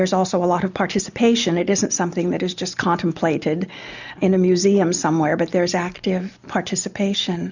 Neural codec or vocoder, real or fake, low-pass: none; real; 7.2 kHz